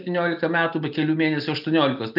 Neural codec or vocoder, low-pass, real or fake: none; 5.4 kHz; real